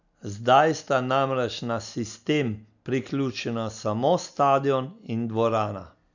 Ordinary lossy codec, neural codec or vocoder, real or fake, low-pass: none; none; real; 7.2 kHz